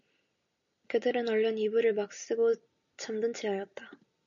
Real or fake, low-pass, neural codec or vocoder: real; 7.2 kHz; none